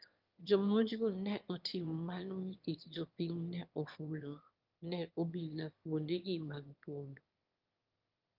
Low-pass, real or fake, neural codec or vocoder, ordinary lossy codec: 5.4 kHz; fake; autoencoder, 22.05 kHz, a latent of 192 numbers a frame, VITS, trained on one speaker; Opus, 24 kbps